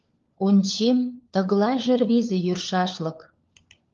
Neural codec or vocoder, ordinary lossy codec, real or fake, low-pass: codec, 16 kHz, 4 kbps, FunCodec, trained on LibriTTS, 50 frames a second; Opus, 32 kbps; fake; 7.2 kHz